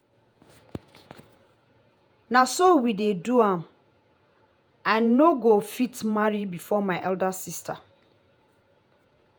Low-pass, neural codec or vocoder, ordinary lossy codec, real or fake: none; vocoder, 48 kHz, 128 mel bands, Vocos; none; fake